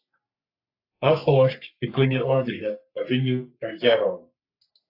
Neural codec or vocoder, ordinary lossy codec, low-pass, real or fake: codec, 44.1 kHz, 3.4 kbps, Pupu-Codec; AAC, 32 kbps; 5.4 kHz; fake